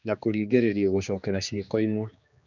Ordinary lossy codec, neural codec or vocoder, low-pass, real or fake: none; codec, 16 kHz, 2 kbps, X-Codec, HuBERT features, trained on general audio; 7.2 kHz; fake